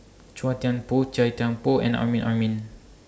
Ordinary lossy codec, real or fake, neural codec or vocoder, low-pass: none; real; none; none